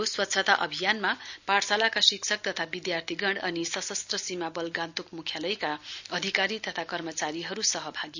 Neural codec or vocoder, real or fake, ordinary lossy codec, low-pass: none; real; none; 7.2 kHz